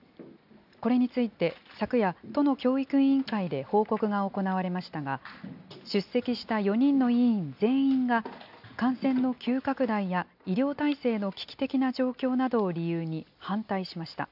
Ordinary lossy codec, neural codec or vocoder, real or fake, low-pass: AAC, 48 kbps; none; real; 5.4 kHz